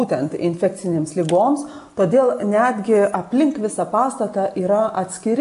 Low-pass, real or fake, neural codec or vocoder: 10.8 kHz; real; none